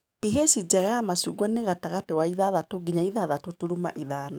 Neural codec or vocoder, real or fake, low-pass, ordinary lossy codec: codec, 44.1 kHz, 7.8 kbps, DAC; fake; none; none